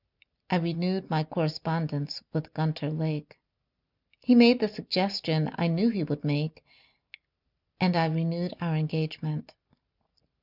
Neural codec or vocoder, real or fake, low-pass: none; real; 5.4 kHz